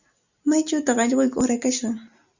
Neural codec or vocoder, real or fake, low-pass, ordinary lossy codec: none; real; 7.2 kHz; Opus, 64 kbps